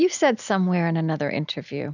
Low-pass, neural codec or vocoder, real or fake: 7.2 kHz; none; real